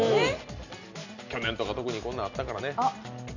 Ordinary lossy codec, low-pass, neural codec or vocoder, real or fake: none; 7.2 kHz; none; real